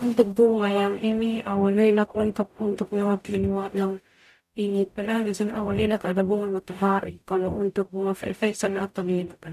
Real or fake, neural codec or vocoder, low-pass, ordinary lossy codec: fake; codec, 44.1 kHz, 0.9 kbps, DAC; 14.4 kHz; none